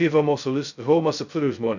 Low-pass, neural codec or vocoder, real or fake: 7.2 kHz; codec, 16 kHz, 0.2 kbps, FocalCodec; fake